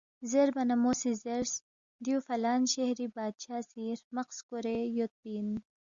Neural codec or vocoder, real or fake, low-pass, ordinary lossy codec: none; real; 7.2 kHz; Opus, 64 kbps